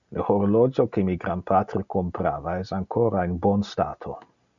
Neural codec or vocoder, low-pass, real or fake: none; 7.2 kHz; real